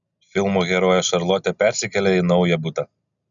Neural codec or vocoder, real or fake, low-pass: none; real; 7.2 kHz